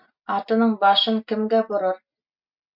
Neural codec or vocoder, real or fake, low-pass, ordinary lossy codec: none; real; 5.4 kHz; MP3, 48 kbps